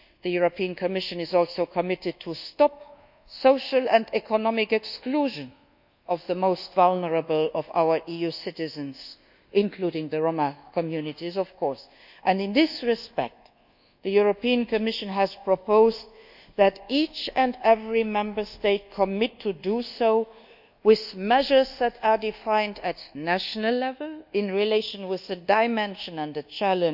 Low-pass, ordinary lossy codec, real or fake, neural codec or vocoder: 5.4 kHz; none; fake; codec, 24 kHz, 1.2 kbps, DualCodec